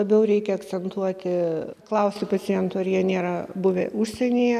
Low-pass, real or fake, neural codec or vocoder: 14.4 kHz; real; none